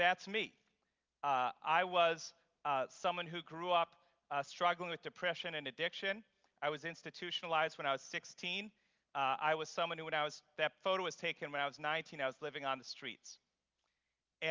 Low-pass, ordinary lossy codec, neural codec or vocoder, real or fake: 7.2 kHz; Opus, 24 kbps; none; real